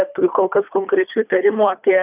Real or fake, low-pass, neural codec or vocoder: fake; 3.6 kHz; codec, 24 kHz, 3 kbps, HILCodec